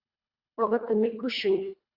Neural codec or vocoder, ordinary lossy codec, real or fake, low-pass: codec, 24 kHz, 1.5 kbps, HILCodec; Opus, 64 kbps; fake; 5.4 kHz